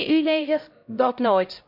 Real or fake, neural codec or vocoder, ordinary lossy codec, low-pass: fake; codec, 16 kHz, 0.5 kbps, X-Codec, HuBERT features, trained on LibriSpeech; none; 5.4 kHz